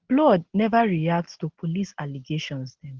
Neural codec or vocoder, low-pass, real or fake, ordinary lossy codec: none; 7.2 kHz; real; Opus, 16 kbps